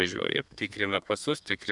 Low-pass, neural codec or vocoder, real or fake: 10.8 kHz; codec, 32 kHz, 1.9 kbps, SNAC; fake